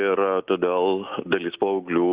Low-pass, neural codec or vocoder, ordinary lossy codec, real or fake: 3.6 kHz; none; Opus, 64 kbps; real